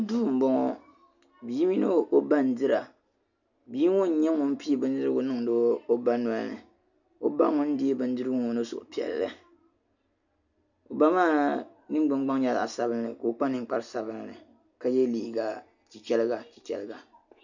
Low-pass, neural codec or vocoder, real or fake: 7.2 kHz; none; real